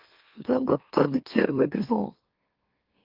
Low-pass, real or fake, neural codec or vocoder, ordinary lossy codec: 5.4 kHz; fake; autoencoder, 44.1 kHz, a latent of 192 numbers a frame, MeloTTS; Opus, 24 kbps